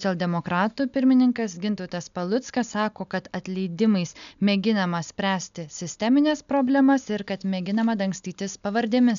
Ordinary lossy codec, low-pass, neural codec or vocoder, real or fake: MP3, 96 kbps; 7.2 kHz; none; real